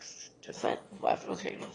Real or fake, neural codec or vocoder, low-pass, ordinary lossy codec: fake; autoencoder, 22.05 kHz, a latent of 192 numbers a frame, VITS, trained on one speaker; 9.9 kHz; AAC, 32 kbps